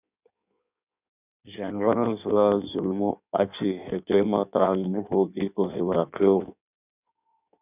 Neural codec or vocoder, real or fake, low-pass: codec, 16 kHz in and 24 kHz out, 1.1 kbps, FireRedTTS-2 codec; fake; 3.6 kHz